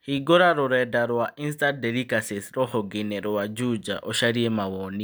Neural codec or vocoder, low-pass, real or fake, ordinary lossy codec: none; none; real; none